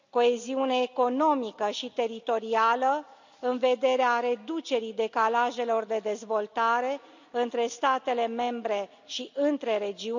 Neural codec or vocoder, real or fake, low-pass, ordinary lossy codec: none; real; 7.2 kHz; none